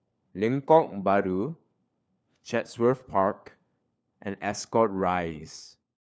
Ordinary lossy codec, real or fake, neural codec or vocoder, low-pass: none; fake; codec, 16 kHz, 4 kbps, FunCodec, trained on LibriTTS, 50 frames a second; none